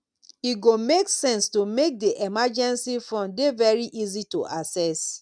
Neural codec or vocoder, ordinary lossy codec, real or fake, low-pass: none; none; real; 9.9 kHz